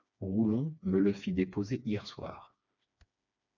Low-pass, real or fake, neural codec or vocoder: 7.2 kHz; fake; codec, 16 kHz, 2 kbps, FreqCodec, smaller model